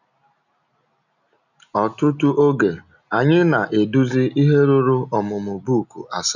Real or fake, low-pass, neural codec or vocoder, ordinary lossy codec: real; 7.2 kHz; none; none